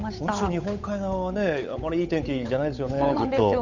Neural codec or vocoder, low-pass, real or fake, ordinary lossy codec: codec, 16 kHz, 8 kbps, FunCodec, trained on Chinese and English, 25 frames a second; 7.2 kHz; fake; none